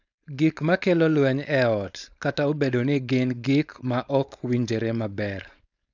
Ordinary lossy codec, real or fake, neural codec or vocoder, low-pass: none; fake; codec, 16 kHz, 4.8 kbps, FACodec; 7.2 kHz